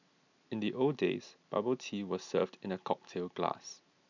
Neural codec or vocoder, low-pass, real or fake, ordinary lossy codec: none; 7.2 kHz; real; none